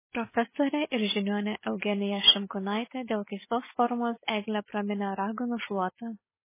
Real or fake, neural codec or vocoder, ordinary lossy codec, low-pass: real; none; MP3, 16 kbps; 3.6 kHz